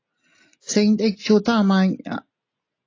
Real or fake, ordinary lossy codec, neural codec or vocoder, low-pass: real; AAC, 32 kbps; none; 7.2 kHz